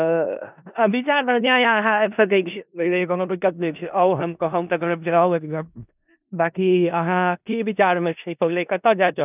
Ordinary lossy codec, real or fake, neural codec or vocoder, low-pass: none; fake; codec, 16 kHz in and 24 kHz out, 0.4 kbps, LongCat-Audio-Codec, four codebook decoder; 3.6 kHz